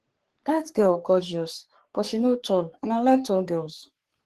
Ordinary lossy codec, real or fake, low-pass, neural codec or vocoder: Opus, 16 kbps; fake; 14.4 kHz; codec, 44.1 kHz, 3.4 kbps, Pupu-Codec